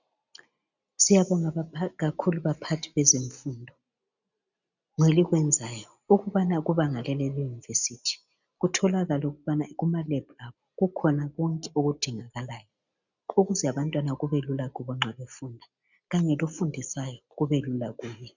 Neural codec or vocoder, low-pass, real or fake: none; 7.2 kHz; real